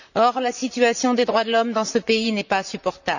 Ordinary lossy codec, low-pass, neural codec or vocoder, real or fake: none; 7.2 kHz; vocoder, 44.1 kHz, 128 mel bands, Pupu-Vocoder; fake